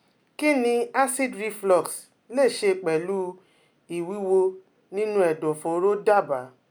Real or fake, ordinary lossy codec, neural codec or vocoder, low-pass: real; none; none; none